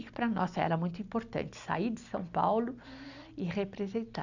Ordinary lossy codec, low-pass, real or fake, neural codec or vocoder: none; 7.2 kHz; real; none